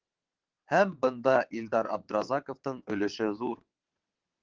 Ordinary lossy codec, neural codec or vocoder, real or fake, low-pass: Opus, 16 kbps; vocoder, 22.05 kHz, 80 mel bands, WaveNeXt; fake; 7.2 kHz